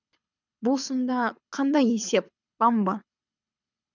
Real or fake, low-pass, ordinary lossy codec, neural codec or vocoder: fake; 7.2 kHz; none; codec, 24 kHz, 6 kbps, HILCodec